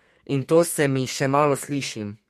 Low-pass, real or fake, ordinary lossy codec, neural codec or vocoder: 14.4 kHz; fake; MP3, 64 kbps; codec, 32 kHz, 1.9 kbps, SNAC